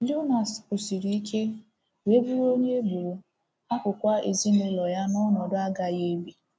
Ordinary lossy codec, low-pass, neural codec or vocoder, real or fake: none; none; none; real